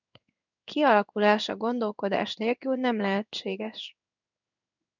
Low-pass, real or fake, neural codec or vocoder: 7.2 kHz; fake; codec, 16 kHz in and 24 kHz out, 1 kbps, XY-Tokenizer